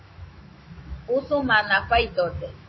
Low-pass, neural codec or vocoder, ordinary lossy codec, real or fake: 7.2 kHz; autoencoder, 48 kHz, 128 numbers a frame, DAC-VAE, trained on Japanese speech; MP3, 24 kbps; fake